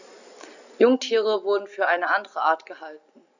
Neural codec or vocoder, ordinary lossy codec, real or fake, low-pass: none; none; real; 7.2 kHz